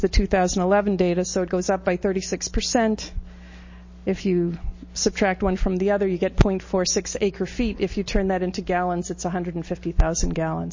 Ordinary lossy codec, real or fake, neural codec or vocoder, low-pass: MP3, 32 kbps; real; none; 7.2 kHz